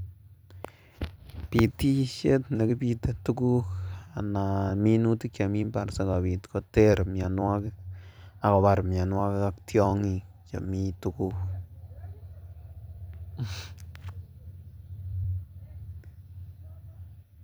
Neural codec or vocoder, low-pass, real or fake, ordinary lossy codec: vocoder, 44.1 kHz, 128 mel bands every 256 samples, BigVGAN v2; none; fake; none